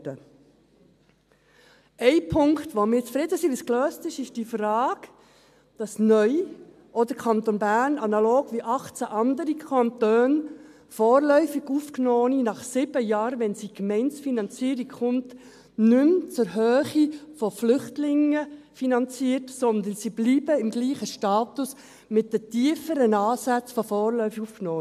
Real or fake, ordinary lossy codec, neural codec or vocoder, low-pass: real; MP3, 96 kbps; none; 14.4 kHz